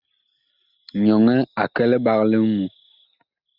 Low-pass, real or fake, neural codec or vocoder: 5.4 kHz; real; none